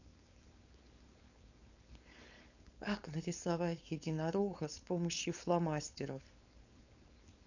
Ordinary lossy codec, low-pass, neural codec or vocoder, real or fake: none; 7.2 kHz; codec, 16 kHz, 4.8 kbps, FACodec; fake